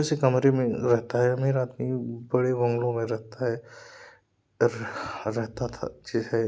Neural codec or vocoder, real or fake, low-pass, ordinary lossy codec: none; real; none; none